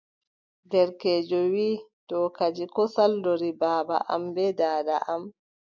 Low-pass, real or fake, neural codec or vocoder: 7.2 kHz; real; none